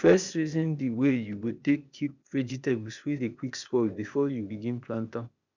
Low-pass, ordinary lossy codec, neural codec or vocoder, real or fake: 7.2 kHz; none; codec, 16 kHz, 0.8 kbps, ZipCodec; fake